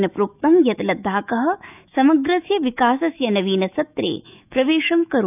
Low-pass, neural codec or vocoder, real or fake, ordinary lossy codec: 3.6 kHz; autoencoder, 48 kHz, 128 numbers a frame, DAC-VAE, trained on Japanese speech; fake; none